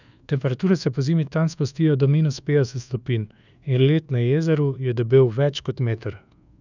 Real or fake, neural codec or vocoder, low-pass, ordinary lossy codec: fake; codec, 24 kHz, 1.2 kbps, DualCodec; 7.2 kHz; none